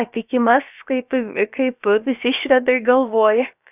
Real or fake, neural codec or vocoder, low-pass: fake; codec, 16 kHz, about 1 kbps, DyCAST, with the encoder's durations; 3.6 kHz